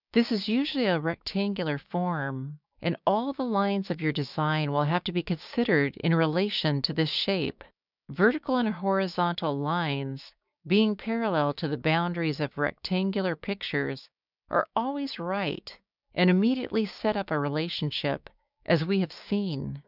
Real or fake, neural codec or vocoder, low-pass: fake; codec, 16 kHz, 6 kbps, DAC; 5.4 kHz